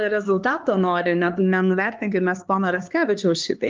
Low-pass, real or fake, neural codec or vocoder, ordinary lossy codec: 7.2 kHz; fake; codec, 16 kHz, 2 kbps, X-Codec, HuBERT features, trained on LibriSpeech; Opus, 24 kbps